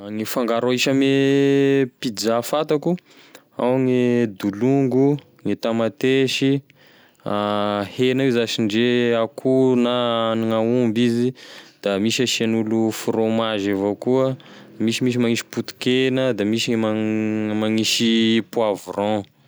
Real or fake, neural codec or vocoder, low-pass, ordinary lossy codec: real; none; none; none